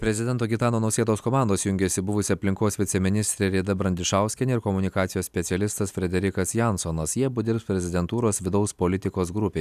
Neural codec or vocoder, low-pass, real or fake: none; 14.4 kHz; real